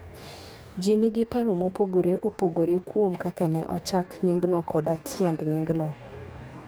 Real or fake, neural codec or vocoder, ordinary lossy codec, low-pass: fake; codec, 44.1 kHz, 2.6 kbps, DAC; none; none